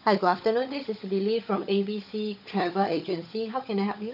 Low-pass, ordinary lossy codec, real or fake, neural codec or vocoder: 5.4 kHz; none; fake; codec, 16 kHz, 16 kbps, FunCodec, trained on LibriTTS, 50 frames a second